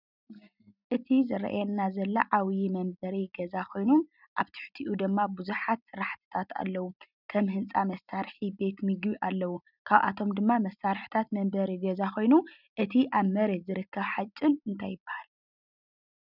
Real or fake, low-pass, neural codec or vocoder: real; 5.4 kHz; none